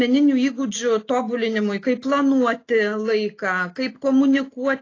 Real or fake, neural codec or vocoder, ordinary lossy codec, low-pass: real; none; AAC, 32 kbps; 7.2 kHz